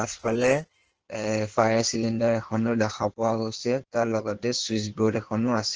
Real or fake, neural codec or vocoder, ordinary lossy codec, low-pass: fake; codec, 16 kHz in and 24 kHz out, 1.1 kbps, FireRedTTS-2 codec; Opus, 16 kbps; 7.2 kHz